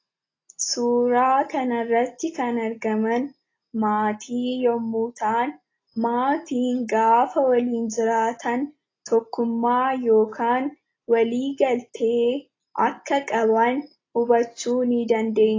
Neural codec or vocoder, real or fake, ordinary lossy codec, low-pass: none; real; AAC, 32 kbps; 7.2 kHz